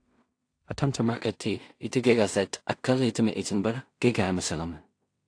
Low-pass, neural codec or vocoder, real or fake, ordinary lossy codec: 9.9 kHz; codec, 16 kHz in and 24 kHz out, 0.4 kbps, LongCat-Audio-Codec, two codebook decoder; fake; AAC, 48 kbps